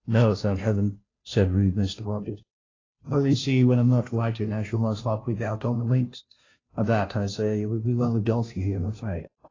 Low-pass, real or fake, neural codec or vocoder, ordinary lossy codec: 7.2 kHz; fake; codec, 16 kHz, 0.5 kbps, FunCodec, trained on Chinese and English, 25 frames a second; AAC, 32 kbps